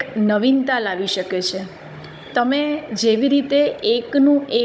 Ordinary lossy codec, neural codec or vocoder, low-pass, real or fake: none; codec, 16 kHz, 16 kbps, FunCodec, trained on Chinese and English, 50 frames a second; none; fake